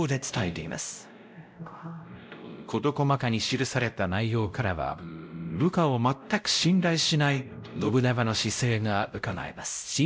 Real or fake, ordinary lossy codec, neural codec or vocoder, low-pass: fake; none; codec, 16 kHz, 0.5 kbps, X-Codec, WavLM features, trained on Multilingual LibriSpeech; none